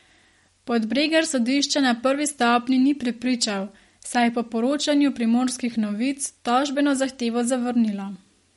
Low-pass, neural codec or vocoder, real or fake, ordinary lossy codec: 19.8 kHz; none; real; MP3, 48 kbps